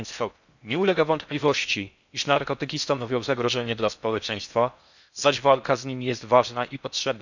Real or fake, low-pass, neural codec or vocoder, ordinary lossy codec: fake; 7.2 kHz; codec, 16 kHz in and 24 kHz out, 0.6 kbps, FocalCodec, streaming, 4096 codes; none